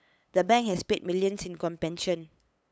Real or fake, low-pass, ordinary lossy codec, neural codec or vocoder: fake; none; none; codec, 16 kHz, 8 kbps, FunCodec, trained on LibriTTS, 25 frames a second